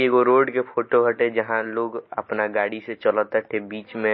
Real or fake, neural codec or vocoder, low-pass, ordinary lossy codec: real; none; 7.2 kHz; MP3, 24 kbps